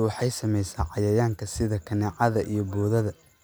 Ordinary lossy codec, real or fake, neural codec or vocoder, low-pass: none; real; none; none